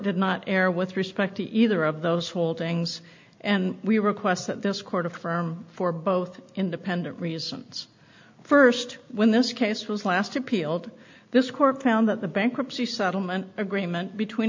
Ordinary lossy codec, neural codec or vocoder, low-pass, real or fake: MP3, 48 kbps; none; 7.2 kHz; real